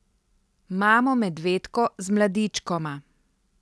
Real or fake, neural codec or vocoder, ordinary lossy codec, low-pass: real; none; none; none